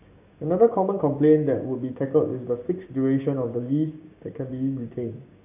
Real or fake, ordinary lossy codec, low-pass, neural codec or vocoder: fake; none; 3.6 kHz; codec, 16 kHz, 6 kbps, DAC